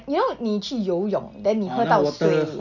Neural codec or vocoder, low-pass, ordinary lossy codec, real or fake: none; 7.2 kHz; none; real